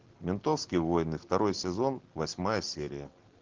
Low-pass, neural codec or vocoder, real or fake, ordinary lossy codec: 7.2 kHz; none; real; Opus, 16 kbps